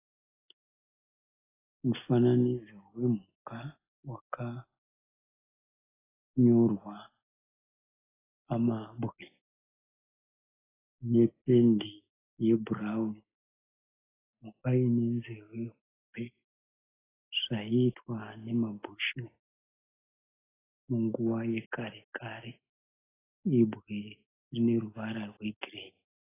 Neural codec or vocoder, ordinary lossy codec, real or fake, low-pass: none; AAC, 16 kbps; real; 3.6 kHz